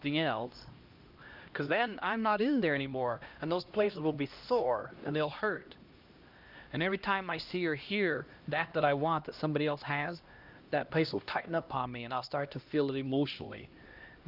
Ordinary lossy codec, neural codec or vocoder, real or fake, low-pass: Opus, 24 kbps; codec, 16 kHz, 1 kbps, X-Codec, HuBERT features, trained on LibriSpeech; fake; 5.4 kHz